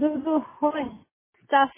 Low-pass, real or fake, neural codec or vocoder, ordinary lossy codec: 3.6 kHz; real; none; MP3, 16 kbps